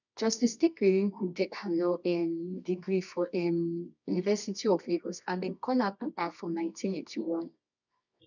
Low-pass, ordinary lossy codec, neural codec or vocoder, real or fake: 7.2 kHz; none; codec, 24 kHz, 0.9 kbps, WavTokenizer, medium music audio release; fake